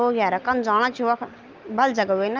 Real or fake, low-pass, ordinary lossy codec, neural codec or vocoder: real; 7.2 kHz; Opus, 24 kbps; none